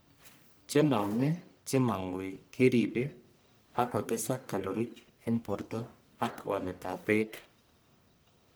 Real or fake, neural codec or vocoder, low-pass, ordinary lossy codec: fake; codec, 44.1 kHz, 1.7 kbps, Pupu-Codec; none; none